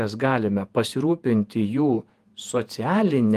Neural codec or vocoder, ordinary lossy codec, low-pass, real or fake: vocoder, 48 kHz, 128 mel bands, Vocos; Opus, 32 kbps; 14.4 kHz; fake